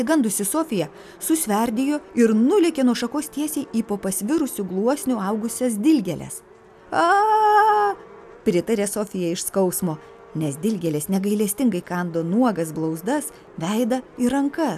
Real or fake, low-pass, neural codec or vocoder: real; 14.4 kHz; none